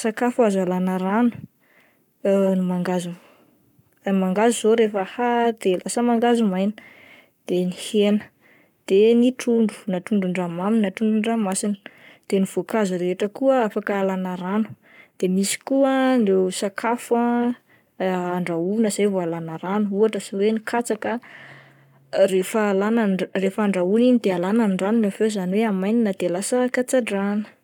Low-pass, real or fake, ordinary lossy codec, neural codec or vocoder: 19.8 kHz; fake; none; codec, 44.1 kHz, 7.8 kbps, Pupu-Codec